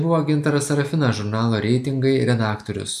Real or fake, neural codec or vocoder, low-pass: real; none; 14.4 kHz